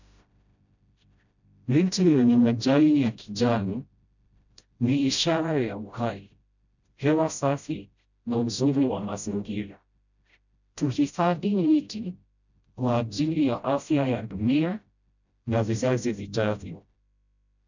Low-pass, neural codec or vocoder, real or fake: 7.2 kHz; codec, 16 kHz, 0.5 kbps, FreqCodec, smaller model; fake